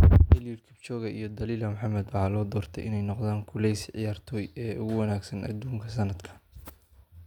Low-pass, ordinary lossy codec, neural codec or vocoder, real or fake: 19.8 kHz; none; none; real